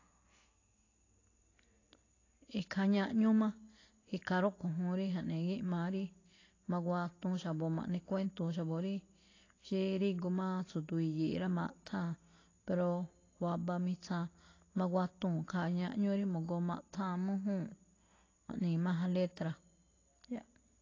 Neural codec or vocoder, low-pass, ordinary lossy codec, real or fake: none; 7.2 kHz; AAC, 32 kbps; real